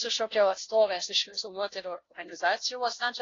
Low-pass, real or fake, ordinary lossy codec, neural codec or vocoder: 7.2 kHz; fake; AAC, 32 kbps; codec, 16 kHz, 0.5 kbps, FunCodec, trained on Chinese and English, 25 frames a second